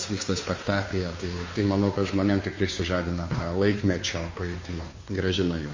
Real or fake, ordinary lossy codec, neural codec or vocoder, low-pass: fake; MP3, 32 kbps; codec, 16 kHz, 2 kbps, FunCodec, trained on Chinese and English, 25 frames a second; 7.2 kHz